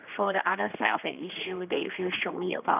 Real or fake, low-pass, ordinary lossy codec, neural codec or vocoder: fake; 3.6 kHz; none; codec, 16 kHz, 2 kbps, X-Codec, HuBERT features, trained on general audio